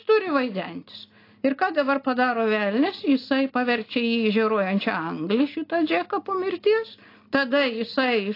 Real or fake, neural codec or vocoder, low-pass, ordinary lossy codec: real; none; 5.4 kHz; AAC, 32 kbps